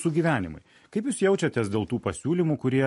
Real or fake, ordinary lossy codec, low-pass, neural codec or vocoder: real; MP3, 48 kbps; 14.4 kHz; none